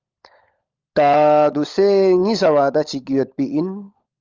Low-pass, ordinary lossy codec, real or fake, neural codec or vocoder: 7.2 kHz; Opus, 32 kbps; fake; codec, 16 kHz, 16 kbps, FunCodec, trained on LibriTTS, 50 frames a second